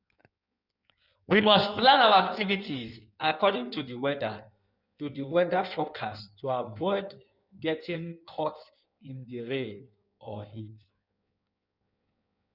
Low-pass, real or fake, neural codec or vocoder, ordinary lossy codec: 5.4 kHz; fake; codec, 16 kHz in and 24 kHz out, 1.1 kbps, FireRedTTS-2 codec; none